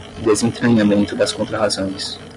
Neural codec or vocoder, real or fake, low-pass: none; real; 10.8 kHz